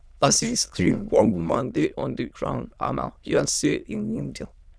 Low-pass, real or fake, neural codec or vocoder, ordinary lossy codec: none; fake; autoencoder, 22.05 kHz, a latent of 192 numbers a frame, VITS, trained on many speakers; none